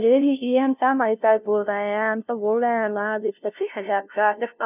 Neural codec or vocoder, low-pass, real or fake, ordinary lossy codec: codec, 16 kHz, 0.5 kbps, FunCodec, trained on LibriTTS, 25 frames a second; 3.6 kHz; fake; none